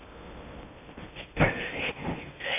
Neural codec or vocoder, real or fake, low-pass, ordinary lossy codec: codec, 16 kHz in and 24 kHz out, 0.8 kbps, FocalCodec, streaming, 65536 codes; fake; 3.6 kHz; AAC, 24 kbps